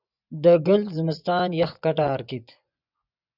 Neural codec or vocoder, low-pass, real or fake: vocoder, 24 kHz, 100 mel bands, Vocos; 5.4 kHz; fake